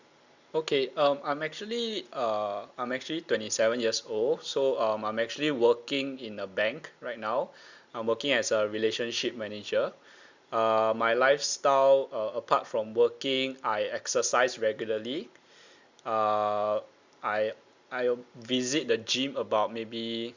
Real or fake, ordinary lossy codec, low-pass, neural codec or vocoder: real; Opus, 64 kbps; 7.2 kHz; none